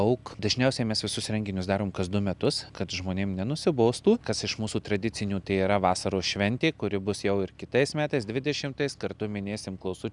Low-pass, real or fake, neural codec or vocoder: 10.8 kHz; real; none